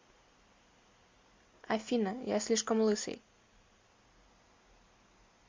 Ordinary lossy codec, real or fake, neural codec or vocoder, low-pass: MP3, 48 kbps; real; none; 7.2 kHz